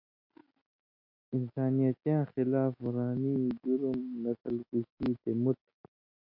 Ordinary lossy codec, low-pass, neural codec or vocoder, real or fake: MP3, 32 kbps; 5.4 kHz; none; real